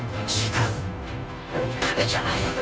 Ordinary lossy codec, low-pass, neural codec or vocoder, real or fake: none; none; codec, 16 kHz, 0.5 kbps, FunCodec, trained on Chinese and English, 25 frames a second; fake